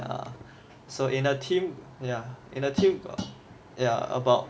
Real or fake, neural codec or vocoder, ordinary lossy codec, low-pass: real; none; none; none